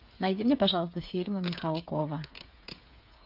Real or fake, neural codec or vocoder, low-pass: fake; codec, 16 kHz, 8 kbps, FreqCodec, smaller model; 5.4 kHz